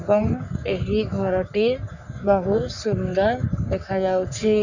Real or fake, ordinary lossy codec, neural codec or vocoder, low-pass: fake; none; codec, 44.1 kHz, 3.4 kbps, Pupu-Codec; 7.2 kHz